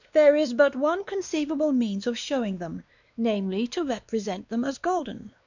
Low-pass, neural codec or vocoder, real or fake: 7.2 kHz; codec, 16 kHz, 2 kbps, X-Codec, WavLM features, trained on Multilingual LibriSpeech; fake